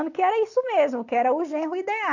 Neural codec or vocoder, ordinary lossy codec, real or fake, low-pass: none; AAC, 48 kbps; real; 7.2 kHz